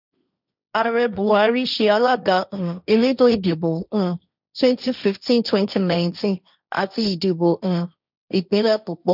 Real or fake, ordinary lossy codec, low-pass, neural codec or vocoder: fake; none; 5.4 kHz; codec, 16 kHz, 1.1 kbps, Voila-Tokenizer